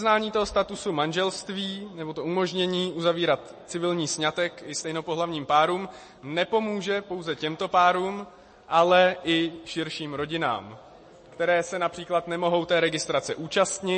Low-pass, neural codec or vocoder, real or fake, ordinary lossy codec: 9.9 kHz; none; real; MP3, 32 kbps